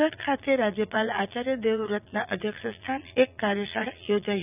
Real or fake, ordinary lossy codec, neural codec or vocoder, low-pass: fake; none; vocoder, 22.05 kHz, 80 mel bands, Vocos; 3.6 kHz